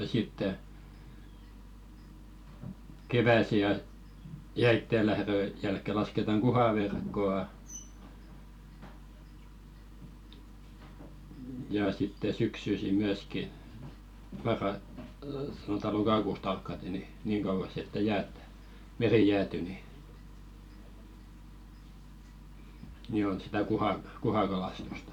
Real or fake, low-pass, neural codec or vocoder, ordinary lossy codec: fake; 19.8 kHz; vocoder, 44.1 kHz, 128 mel bands every 256 samples, BigVGAN v2; none